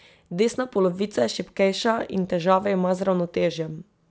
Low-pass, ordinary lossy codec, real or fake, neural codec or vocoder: none; none; real; none